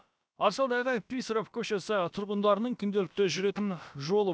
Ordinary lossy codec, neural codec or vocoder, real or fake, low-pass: none; codec, 16 kHz, about 1 kbps, DyCAST, with the encoder's durations; fake; none